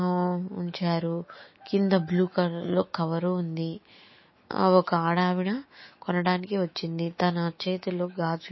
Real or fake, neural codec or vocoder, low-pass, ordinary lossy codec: fake; autoencoder, 48 kHz, 128 numbers a frame, DAC-VAE, trained on Japanese speech; 7.2 kHz; MP3, 24 kbps